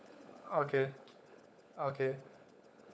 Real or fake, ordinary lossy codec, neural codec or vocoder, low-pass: fake; none; codec, 16 kHz, 16 kbps, FunCodec, trained on LibriTTS, 50 frames a second; none